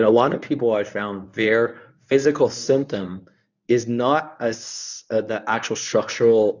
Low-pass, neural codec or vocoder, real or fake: 7.2 kHz; codec, 24 kHz, 0.9 kbps, WavTokenizer, medium speech release version 1; fake